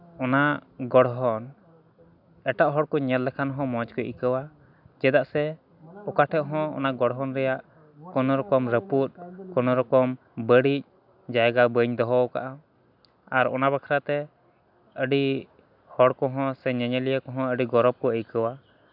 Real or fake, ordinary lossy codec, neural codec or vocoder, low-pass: real; none; none; 5.4 kHz